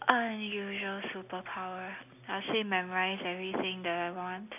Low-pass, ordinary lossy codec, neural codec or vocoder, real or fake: 3.6 kHz; none; none; real